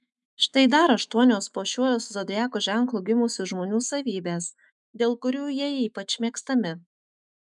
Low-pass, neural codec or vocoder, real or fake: 10.8 kHz; autoencoder, 48 kHz, 128 numbers a frame, DAC-VAE, trained on Japanese speech; fake